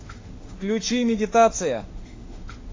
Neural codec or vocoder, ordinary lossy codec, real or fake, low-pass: autoencoder, 48 kHz, 32 numbers a frame, DAC-VAE, trained on Japanese speech; AAC, 32 kbps; fake; 7.2 kHz